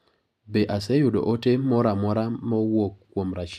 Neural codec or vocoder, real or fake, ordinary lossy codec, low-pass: none; real; AAC, 96 kbps; 14.4 kHz